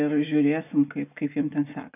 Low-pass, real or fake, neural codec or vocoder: 3.6 kHz; real; none